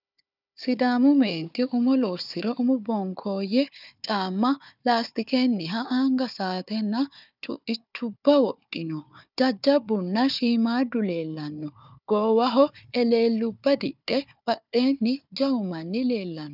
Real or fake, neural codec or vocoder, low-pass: fake; codec, 16 kHz, 4 kbps, FunCodec, trained on Chinese and English, 50 frames a second; 5.4 kHz